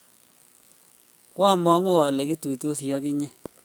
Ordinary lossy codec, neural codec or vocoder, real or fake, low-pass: none; codec, 44.1 kHz, 2.6 kbps, SNAC; fake; none